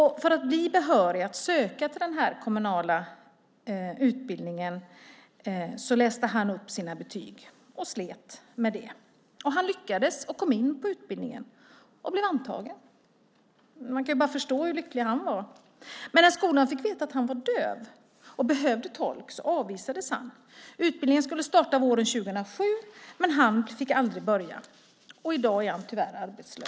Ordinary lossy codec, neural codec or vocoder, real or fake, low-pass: none; none; real; none